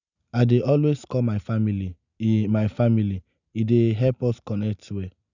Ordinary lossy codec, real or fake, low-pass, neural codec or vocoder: none; real; 7.2 kHz; none